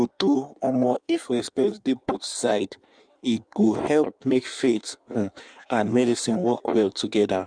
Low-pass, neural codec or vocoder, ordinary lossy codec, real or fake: 9.9 kHz; codec, 16 kHz in and 24 kHz out, 1.1 kbps, FireRedTTS-2 codec; none; fake